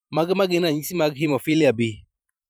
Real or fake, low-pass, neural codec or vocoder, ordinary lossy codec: fake; none; vocoder, 44.1 kHz, 128 mel bands every 512 samples, BigVGAN v2; none